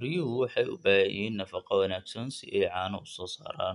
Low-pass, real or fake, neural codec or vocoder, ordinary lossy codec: 10.8 kHz; real; none; none